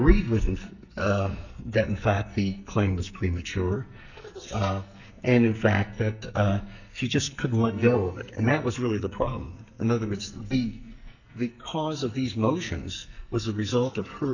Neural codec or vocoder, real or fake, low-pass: codec, 44.1 kHz, 2.6 kbps, SNAC; fake; 7.2 kHz